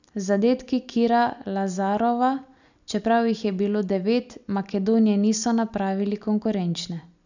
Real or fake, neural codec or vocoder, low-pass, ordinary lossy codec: real; none; 7.2 kHz; none